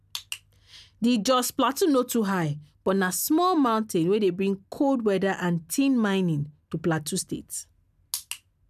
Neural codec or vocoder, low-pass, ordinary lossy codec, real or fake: none; 14.4 kHz; none; real